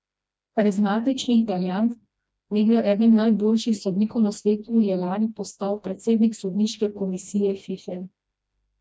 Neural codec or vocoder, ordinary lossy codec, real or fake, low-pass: codec, 16 kHz, 1 kbps, FreqCodec, smaller model; none; fake; none